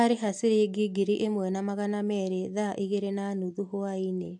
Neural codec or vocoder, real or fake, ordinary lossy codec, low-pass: none; real; AAC, 64 kbps; 10.8 kHz